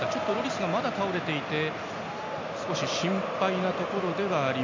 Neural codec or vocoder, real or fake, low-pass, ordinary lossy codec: none; real; 7.2 kHz; MP3, 64 kbps